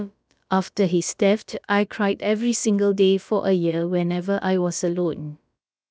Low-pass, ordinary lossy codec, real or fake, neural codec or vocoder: none; none; fake; codec, 16 kHz, about 1 kbps, DyCAST, with the encoder's durations